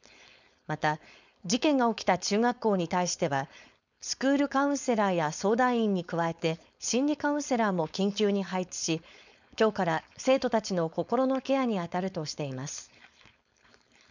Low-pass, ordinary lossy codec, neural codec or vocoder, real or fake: 7.2 kHz; none; codec, 16 kHz, 4.8 kbps, FACodec; fake